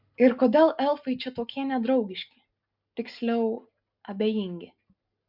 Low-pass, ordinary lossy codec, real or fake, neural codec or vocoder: 5.4 kHz; MP3, 48 kbps; real; none